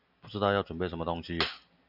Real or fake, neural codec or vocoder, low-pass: real; none; 5.4 kHz